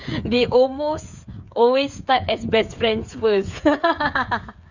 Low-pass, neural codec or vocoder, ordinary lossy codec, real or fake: 7.2 kHz; codec, 16 kHz, 8 kbps, FreqCodec, smaller model; none; fake